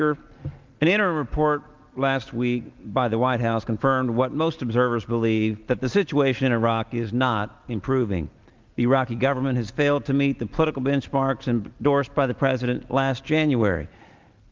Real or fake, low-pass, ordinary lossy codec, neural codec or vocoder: real; 7.2 kHz; Opus, 32 kbps; none